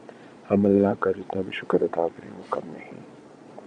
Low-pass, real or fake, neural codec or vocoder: 9.9 kHz; fake; vocoder, 22.05 kHz, 80 mel bands, Vocos